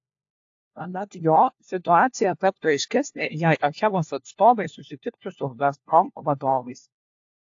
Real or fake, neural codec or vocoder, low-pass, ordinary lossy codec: fake; codec, 16 kHz, 1 kbps, FunCodec, trained on LibriTTS, 50 frames a second; 7.2 kHz; AAC, 48 kbps